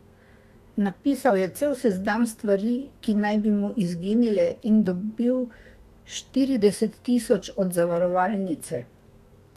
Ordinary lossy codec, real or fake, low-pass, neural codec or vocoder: none; fake; 14.4 kHz; codec, 32 kHz, 1.9 kbps, SNAC